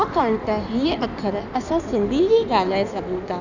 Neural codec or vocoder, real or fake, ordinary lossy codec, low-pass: codec, 16 kHz in and 24 kHz out, 1.1 kbps, FireRedTTS-2 codec; fake; none; 7.2 kHz